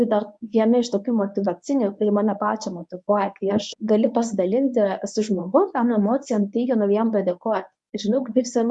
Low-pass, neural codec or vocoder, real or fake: 10.8 kHz; codec, 24 kHz, 0.9 kbps, WavTokenizer, medium speech release version 1; fake